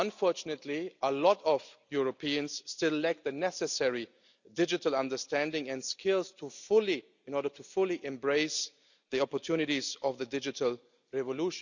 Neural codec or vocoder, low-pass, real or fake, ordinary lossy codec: none; 7.2 kHz; real; none